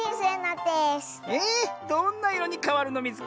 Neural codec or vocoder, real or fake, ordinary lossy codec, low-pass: none; real; none; none